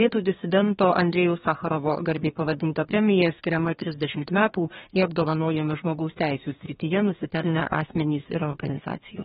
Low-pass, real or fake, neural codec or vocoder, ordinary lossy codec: 14.4 kHz; fake; codec, 32 kHz, 1.9 kbps, SNAC; AAC, 16 kbps